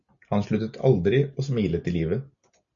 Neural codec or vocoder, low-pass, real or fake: none; 7.2 kHz; real